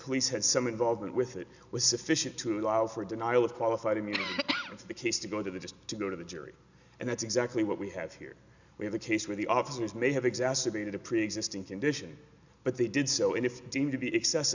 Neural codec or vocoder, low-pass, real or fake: none; 7.2 kHz; real